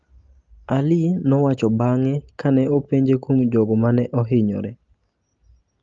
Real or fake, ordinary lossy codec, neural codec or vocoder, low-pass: real; Opus, 32 kbps; none; 7.2 kHz